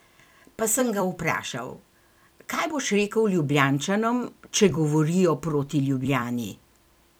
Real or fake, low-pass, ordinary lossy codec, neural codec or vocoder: fake; none; none; vocoder, 44.1 kHz, 128 mel bands every 256 samples, BigVGAN v2